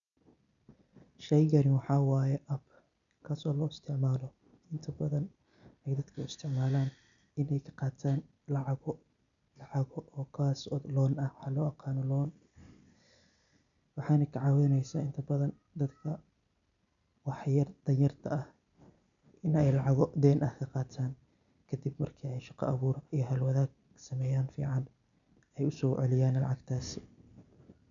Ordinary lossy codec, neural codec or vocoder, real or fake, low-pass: none; none; real; 7.2 kHz